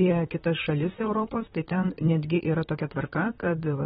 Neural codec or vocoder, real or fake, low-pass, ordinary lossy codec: vocoder, 44.1 kHz, 128 mel bands, Pupu-Vocoder; fake; 19.8 kHz; AAC, 16 kbps